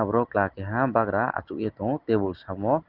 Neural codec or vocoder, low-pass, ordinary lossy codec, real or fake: none; 5.4 kHz; Opus, 16 kbps; real